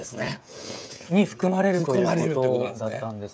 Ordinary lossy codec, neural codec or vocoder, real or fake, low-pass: none; codec, 16 kHz, 16 kbps, FreqCodec, smaller model; fake; none